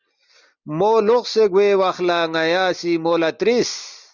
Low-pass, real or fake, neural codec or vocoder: 7.2 kHz; real; none